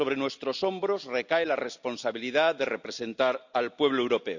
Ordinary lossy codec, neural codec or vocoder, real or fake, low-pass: none; none; real; 7.2 kHz